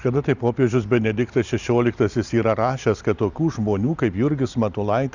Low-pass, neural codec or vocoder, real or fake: 7.2 kHz; none; real